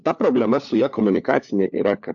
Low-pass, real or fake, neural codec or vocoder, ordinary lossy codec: 7.2 kHz; fake; codec, 16 kHz, 4 kbps, FunCodec, trained on LibriTTS, 50 frames a second; AAC, 64 kbps